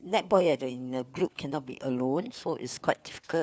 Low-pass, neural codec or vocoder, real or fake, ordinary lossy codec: none; codec, 16 kHz, 4 kbps, FunCodec, trained on LibriTTS, 50 frames a second; fake; none